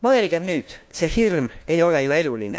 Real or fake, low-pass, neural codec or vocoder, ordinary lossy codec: fake; none; codec, 16 kHz, 1 kbps, FunCodec, trained on LibriTTS, 50 frames a second; none